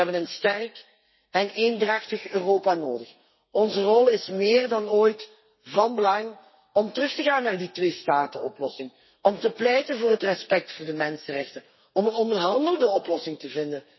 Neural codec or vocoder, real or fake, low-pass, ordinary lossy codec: codec, 32 kHz, 1.9 kbps, SNAC; fake; 7.2 kHz; MP3, 24 kbps